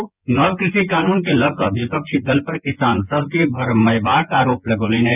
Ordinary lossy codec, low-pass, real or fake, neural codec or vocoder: none; 3.6 kHz; fake; vocoder, 24 kHz, 100 mel bands, Vocos